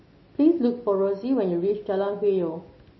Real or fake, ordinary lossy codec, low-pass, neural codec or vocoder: real; MP3, 24 kbps; 7.2 kHz; none